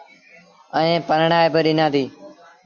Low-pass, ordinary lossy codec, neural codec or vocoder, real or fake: 7.2 kHz; Opus, 64 kbps; none; real